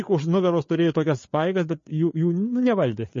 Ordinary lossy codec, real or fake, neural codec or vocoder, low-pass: MP3, 32 kbps; fake; codec, 16 kHz, 4 kbps, FreqCodec, larger model; 7.2 kHz